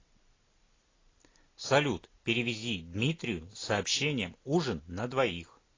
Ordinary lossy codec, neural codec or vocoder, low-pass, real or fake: AAC, 32 kbps; none; 7.2 kHz; real